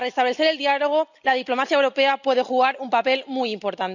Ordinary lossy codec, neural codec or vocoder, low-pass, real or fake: none; none; 7.2 kHz; real